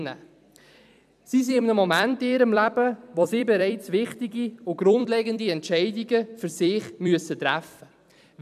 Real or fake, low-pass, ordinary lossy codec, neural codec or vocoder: fake; 14.4 kHz; none; vocoder, 44.1 kHz, 128 mel bands every 256 samples, BigVGAN v2